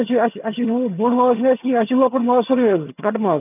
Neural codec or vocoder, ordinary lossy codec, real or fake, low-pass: vocoder, 22.05 kHz, 80 mel bands, HiFi-GAN; none; fake; 3.6 kHz